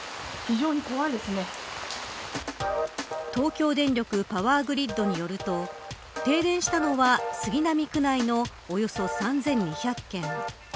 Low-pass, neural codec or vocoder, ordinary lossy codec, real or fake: none; none; none; real